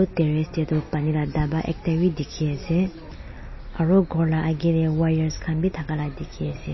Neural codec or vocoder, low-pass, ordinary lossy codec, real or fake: none; 7.2 kHz; MP3, 24 kbps; real